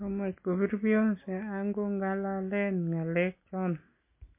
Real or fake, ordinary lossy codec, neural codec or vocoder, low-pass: real; MP3, 24 kbps; none; 3.6 kHz